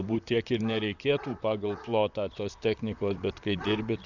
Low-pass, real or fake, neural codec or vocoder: 7.2 kHz; fake; vocoder, 44.1 kHz, 128 mel bands, Pupu-Vocoder